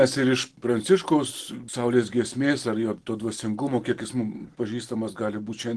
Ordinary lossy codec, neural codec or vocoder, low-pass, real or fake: Opus, 16 kbps; none; 10.8 kHz; real